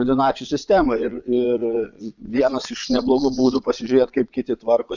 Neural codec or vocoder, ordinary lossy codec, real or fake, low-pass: vocoder, 22.05 kHz, 80 mel bands, Vocos; Opus, 64 kbps; fake; 7.2 kHz